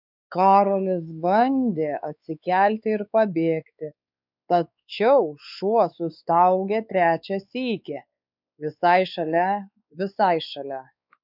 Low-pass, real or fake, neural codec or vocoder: 5.4 kHz; fake; codec, 16 kHz, 4 kbps, X-Codec, WavLM features, trained on Multilingual LibriSpeech